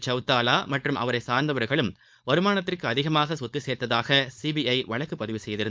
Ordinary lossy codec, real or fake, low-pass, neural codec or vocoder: none; fake; none; codec, 16 kHz, 4.8 kbps, FACodec